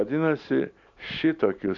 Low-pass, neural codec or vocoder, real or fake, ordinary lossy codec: 7.2 kHz; none; real; AAC, 64 kbps